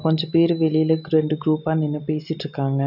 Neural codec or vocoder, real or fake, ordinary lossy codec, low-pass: none; real; none; 5.4 kHz